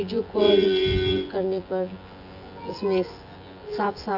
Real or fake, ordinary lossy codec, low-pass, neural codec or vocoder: fake; AAC, 48 kbps; 5.4 kHz; vocoder, 24 kHz, 100 mel bands, Vocos